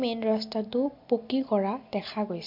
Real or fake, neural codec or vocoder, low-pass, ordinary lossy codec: real; none; 5.4 kHz; MP3, 48 kbps